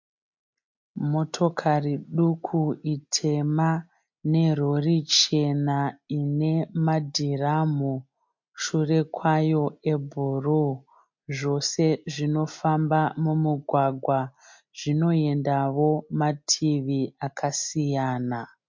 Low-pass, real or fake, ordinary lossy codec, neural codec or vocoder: 7.2 kHz; real; MP3, 48 kbps; none